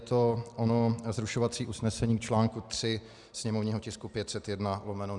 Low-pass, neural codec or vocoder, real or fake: 10.8 kHz; none; real